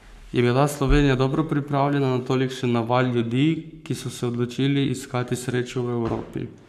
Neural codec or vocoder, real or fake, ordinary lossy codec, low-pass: codec, 44.1 kHz, 7.8 kbps, Pupu-Codec; fake; none; 14.4 kHz